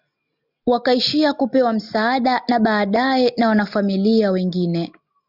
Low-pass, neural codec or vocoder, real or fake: 5.4 kHz; none; real